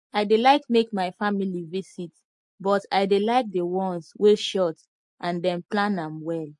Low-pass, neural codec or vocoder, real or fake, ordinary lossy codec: 10.8 kHz; none; real; MP3, 48 kbps